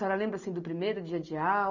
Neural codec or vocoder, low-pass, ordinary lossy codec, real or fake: none; 7.2 kHz; none; real